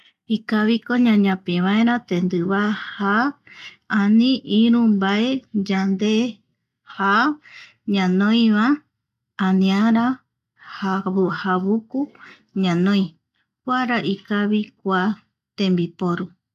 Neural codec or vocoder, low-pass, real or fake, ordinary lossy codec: none; 14.4 kHz; real; none